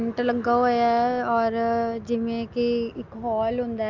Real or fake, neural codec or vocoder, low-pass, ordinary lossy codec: real; none; 7.2 kHz; Opus, 24 kbps